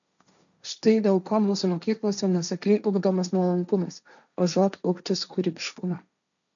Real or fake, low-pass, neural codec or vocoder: fake; 7.2 kHz; codec, 16 kHz, 1.1 kbps, Voila-Tokenizer